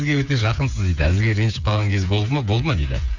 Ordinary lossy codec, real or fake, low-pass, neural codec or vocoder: none; fake; 7.2 kHz; codec, 16 kHz, 8 kbps, FreqCodec, smaller model